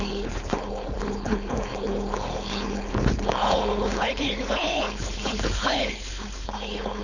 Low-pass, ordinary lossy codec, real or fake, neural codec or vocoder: 7.2 kHz; none; fake; codec, 16 kHz, 4.8 kbps, FACodec